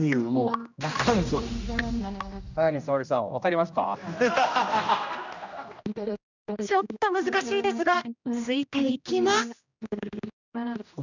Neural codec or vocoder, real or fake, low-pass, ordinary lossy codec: codec, 16 kHz, 1 kbps, X-Codec, HuBERT features, trained on general audio; fake; 7.2 kHz; none